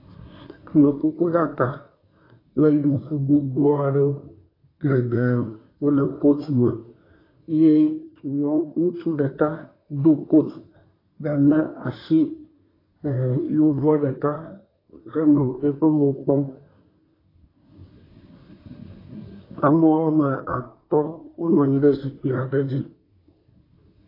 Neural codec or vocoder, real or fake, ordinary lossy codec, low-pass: codec, 24 kHz, 1 kbps, SNAC; fake; AAC, 24 kbps; 5.4 kHz